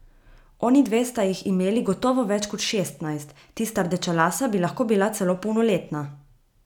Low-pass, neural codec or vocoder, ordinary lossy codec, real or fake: 19.8 kHz; none; none; real